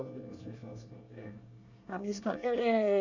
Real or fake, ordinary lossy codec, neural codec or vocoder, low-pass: fake; none; codec, 24 kHz, 1 kbps, SNAC; 7.2 kHz